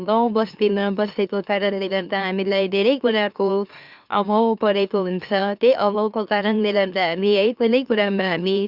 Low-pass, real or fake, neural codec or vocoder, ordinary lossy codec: 5.4 kHz; fake; autoencoder, 44.1 kHz, a latent of 192 numbers a frame, MeloTTS; Opus, 64 kbps